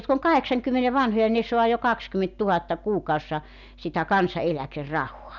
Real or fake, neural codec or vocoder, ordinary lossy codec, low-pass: real; none; none; 7.2 kHz